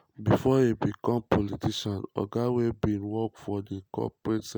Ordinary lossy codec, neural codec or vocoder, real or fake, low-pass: none; none; real; none